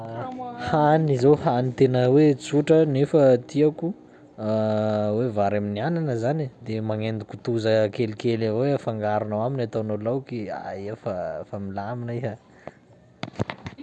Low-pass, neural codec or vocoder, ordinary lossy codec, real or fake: none; none; none; real